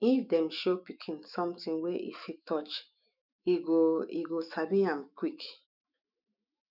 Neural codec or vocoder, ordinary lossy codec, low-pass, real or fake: autoencoder, 48 kHz, 128 numbers a frame, DAC-VAE, trained on Japanese speech; none; 5.4 kHz; fake